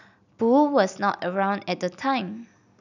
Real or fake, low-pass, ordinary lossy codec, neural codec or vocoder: real; 7.2 kHz; none; none